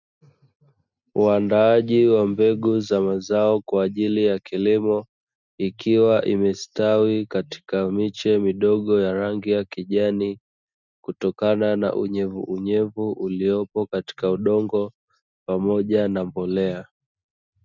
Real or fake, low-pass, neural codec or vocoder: real; 7.2 kHz; none